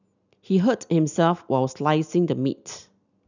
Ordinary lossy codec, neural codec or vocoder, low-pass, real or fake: none; none; 7.2 kHz; real